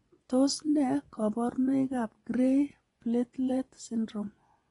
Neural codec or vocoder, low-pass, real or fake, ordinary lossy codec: vocoder, 24 kHz, 100 mel bands, Vocos; 10.8 kHz; fake; AAC, 32 kbps